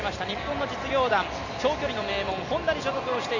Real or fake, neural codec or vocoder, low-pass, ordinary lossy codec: real; none; 7.2 kHz; none